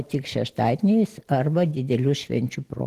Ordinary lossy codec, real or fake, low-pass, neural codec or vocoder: Opus, 16 kbps; real; 14.4 kHz; none